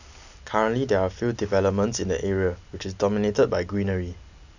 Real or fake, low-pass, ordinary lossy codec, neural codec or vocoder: real; 7.2 kHz; none; none